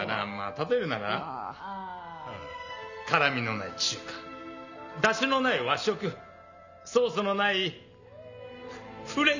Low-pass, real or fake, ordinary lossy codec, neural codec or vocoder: 7.2 kHz; real; none; none